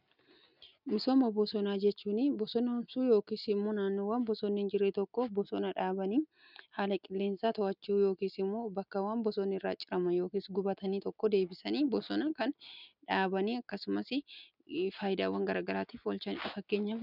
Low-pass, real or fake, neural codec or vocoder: 5.4 kHz; real; none